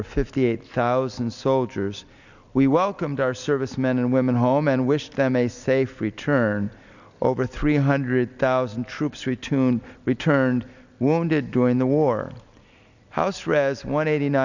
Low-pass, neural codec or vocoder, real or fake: 7.2 kHz; none; real